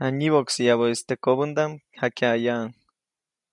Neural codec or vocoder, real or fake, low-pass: none; real; 10.8 kHz